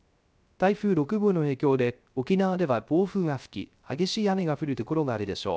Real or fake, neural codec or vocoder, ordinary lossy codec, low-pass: fake; codec, 16 kHz, 0.3 kbps, FocalCodec; none; none